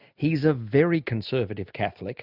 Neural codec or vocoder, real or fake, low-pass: none; real; 5.4 kHz